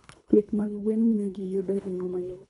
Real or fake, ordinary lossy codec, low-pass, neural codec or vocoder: fake; none; 10.8 kHz; codec, 24 kHz, 3 kbps, HILCodec